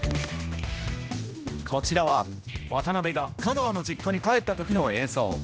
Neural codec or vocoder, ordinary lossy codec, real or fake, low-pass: codec, 16 kHz, 1 kbps, X-Codec, HuBERT features, trained on general audio; none; fake; none